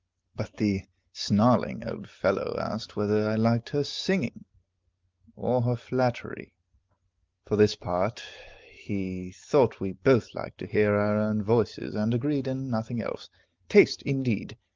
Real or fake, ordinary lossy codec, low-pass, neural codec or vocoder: real; Opus, 32 kbps; 7.2 kHz; none